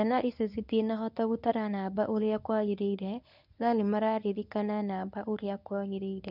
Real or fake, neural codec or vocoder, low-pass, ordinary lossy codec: fake; codec, 24 kHz, 0.9 kbps, WavTokenizer, medium speech release version 1; 5.4 kHz; none